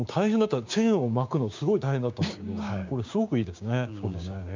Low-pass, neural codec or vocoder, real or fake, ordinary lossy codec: 7.2 kHz; codec, 16 kHz, 6 kbps, DAC; fake; MP3, 64 kbps